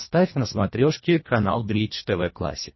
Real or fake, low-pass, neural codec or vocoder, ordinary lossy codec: fake; 7.2 kHz; codec, 24 kHz, 1.5 kbps, HILCodec; MP3, 24 kbps